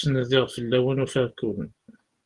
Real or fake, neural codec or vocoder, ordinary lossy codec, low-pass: real; none; Opus, 24 kbps; 10.8 kHz